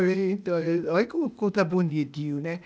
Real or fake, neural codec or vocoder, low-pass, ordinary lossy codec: fake; codec, 16 kHz, 0.8 kbps, ZipCodec; none; none